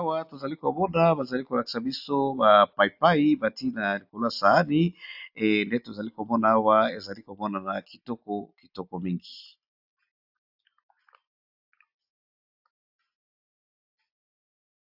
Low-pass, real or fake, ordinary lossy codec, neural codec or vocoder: 5.4 kHz; real; AAC, 48 kbps; none